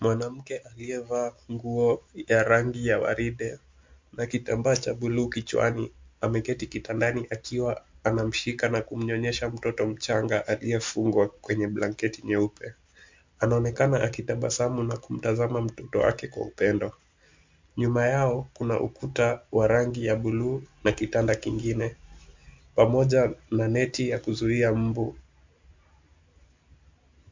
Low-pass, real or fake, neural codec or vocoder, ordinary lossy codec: 7.2 kHz; real; none; MP3, 48 kbps